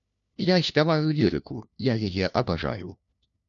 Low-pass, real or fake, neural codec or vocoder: 7.2 kHz; fake; codec, 16 kHz, 1 kbps, FunCodec, trained on LibriTTS, 50 frames a second